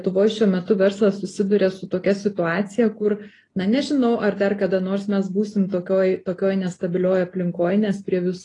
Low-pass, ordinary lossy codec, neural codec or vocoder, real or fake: 10.8 kHz; AAC, 32 kbps; none; real